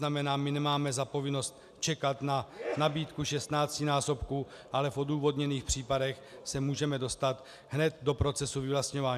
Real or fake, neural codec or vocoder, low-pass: real; none; 14.4 kHz